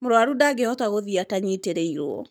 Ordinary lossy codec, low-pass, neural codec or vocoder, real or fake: none; none; codec, 44.1 kHz, 7.8 kbps, Pupu-Codec; fake